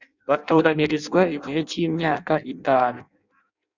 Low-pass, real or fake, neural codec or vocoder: 7.2 kHz; fake; codec, 16 kHz in and 24 kHz out, 0.6 kbps, FireRedTTS-2 codec